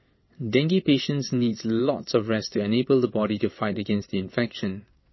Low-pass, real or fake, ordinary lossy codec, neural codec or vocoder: 7.2 kHz; fake; MP3, 24 kbps; vocoder, 22.05 kHz, 80 mel bands, WaveNeXt